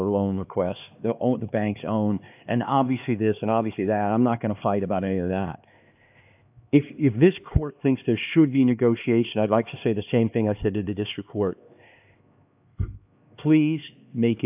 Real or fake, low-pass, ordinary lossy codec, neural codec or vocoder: fake; 3.6 kHz; AAC, 32 kbps; codec, 16 kHz, 2 kbps, X-Codec, HuBERT features, trained on LibriSpeech